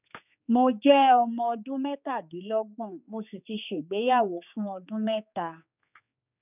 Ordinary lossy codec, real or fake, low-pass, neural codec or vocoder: none; fake; 3.6 kHz; codec, 16 kHz, 4 kbps, X-Codec, HuBERT features, trained on general audio